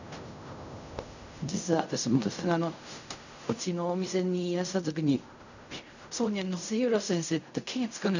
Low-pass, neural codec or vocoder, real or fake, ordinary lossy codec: 7.2 kHz; codec, 16 kHz in and 24 kHz out, 0.4 kbps, LongCat-Audio-Codec, fine tuned four codebook decoder; fake; none